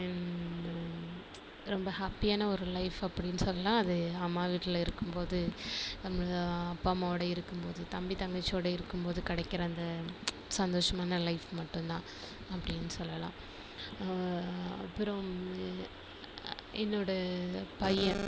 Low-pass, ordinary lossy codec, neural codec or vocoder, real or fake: none; none; none; real